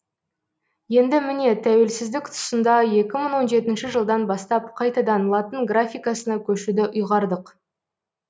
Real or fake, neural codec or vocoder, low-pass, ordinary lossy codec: real; none; none; none